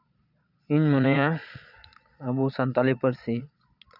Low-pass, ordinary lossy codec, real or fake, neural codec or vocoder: 5.4 kHz; none; fake; vocoder, 22.05 kHz, 80 mel bands, Vocos